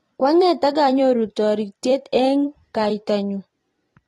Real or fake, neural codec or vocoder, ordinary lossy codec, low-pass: fake; vocoder, 44.1 kHz, 128 mel bands every 256 samples, BigVGAN v2; AAC, 32 kbps; 19.8 kHz